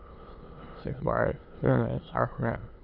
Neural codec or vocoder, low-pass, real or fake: autoencoder, 22.05 kHz, a latent of 192 numbers a frame, VITS, trained on many speakers; 5.4 kHz; fake